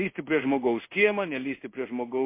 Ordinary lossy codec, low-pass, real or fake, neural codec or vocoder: MP3, 24 kbps; 3.6 kHz; fake; codec, 16 kHz in and 24 kHz out, 1 kbps, XY-Tokenizer